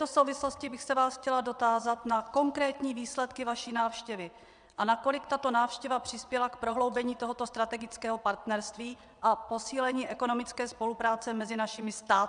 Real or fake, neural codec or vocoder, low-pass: fake; vocoder, 22.05 kHz, 80 mel bands, WaveNeXt; 9.9 kHz